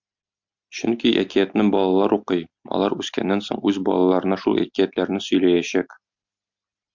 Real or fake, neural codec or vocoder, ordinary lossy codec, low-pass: real; none; MP3, 64 kbps; 7.2 kHz